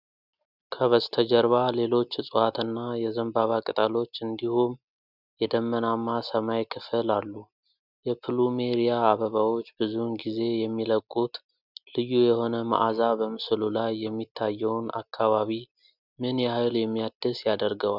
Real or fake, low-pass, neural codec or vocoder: real; 5.4 kHz; none